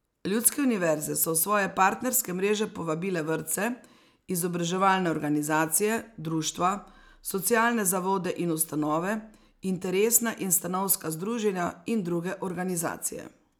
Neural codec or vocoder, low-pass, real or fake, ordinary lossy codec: none; none; real; none